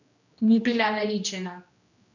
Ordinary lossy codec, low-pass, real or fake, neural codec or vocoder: none; 7.2 kHz; fake; codec, 16 kHz, 1 kbps, X-Codec, HuBERT features, trained on general audio